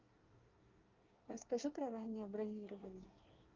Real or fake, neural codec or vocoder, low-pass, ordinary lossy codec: fake; codec, 24 kHz, 1 kbps, SNAC; 7.2 kHz; Opus, 24 kbps